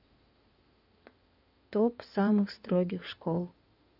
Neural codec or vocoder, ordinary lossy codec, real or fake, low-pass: codec, 16 kHz in and 24 kHz out, 2.2 kbps, FireRedTTS-2 codec; none; fake; 5.4 kHz